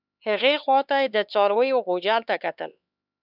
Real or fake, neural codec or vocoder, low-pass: fake; codec, 16 kHz, 4 kbps, X-Codec, HuBERT features, trained on LibriSpeech; 5.4 kHz